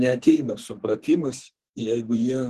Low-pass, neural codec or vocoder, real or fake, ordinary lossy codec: 14.4 kHz; codec, 32 kHz, 1.9 kbps, SNAC; fake; Opus, 16 kbps